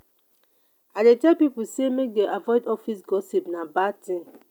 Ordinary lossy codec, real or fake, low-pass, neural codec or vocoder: none; real; 19.8 kHz; none